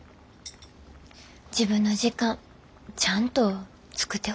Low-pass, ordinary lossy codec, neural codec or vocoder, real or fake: none; none; none; real